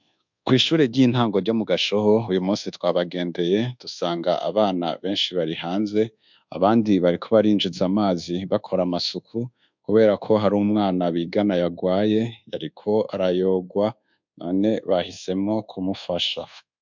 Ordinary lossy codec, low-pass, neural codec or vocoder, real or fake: MP3, 64 kbps; 7.2 kHz; codec, 24 kHz, 1.2 kbps, DualCodec; fake